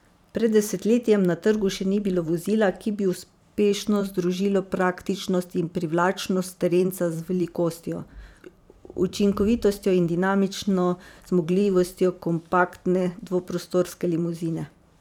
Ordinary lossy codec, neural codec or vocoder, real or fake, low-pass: none; vocoder, 44.1 kHz, 128 mel bands every 512 samples, BigVGAN v2; fake; 19.8 kHz